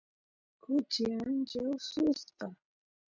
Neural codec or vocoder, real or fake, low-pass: none; real; 7.2 kHz